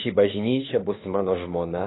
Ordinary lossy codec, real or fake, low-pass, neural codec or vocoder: AAC, 16 kbps; fake; 7.2 kHz; autoencoder, 48 kHz, 32 numbers a frame, DAC-VAE, trained on Japanese speech